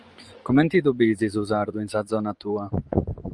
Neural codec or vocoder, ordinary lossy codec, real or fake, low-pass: vocoder, 24 kHz, 100 mel bands, Vocos; Opus, 24 kbps; fake; 10.8 kHz